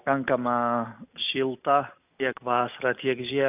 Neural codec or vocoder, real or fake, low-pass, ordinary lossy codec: none; real; 3.6 kHz; AAC, 32 kbps